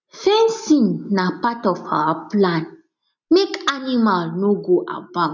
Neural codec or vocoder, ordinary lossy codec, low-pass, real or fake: none; none; 7.2 kHz; real